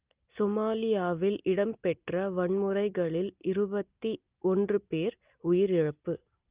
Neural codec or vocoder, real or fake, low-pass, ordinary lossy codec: none; real; 3.6 kHz; Opus, 24 kbps